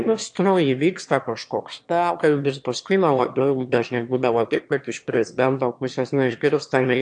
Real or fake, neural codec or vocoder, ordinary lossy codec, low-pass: fake; autoencoder, 22.05 kHz, a latent of 192 numbers a frame, VITS, trained on one speaker; AAC, 64 kbps; 9.9 kHz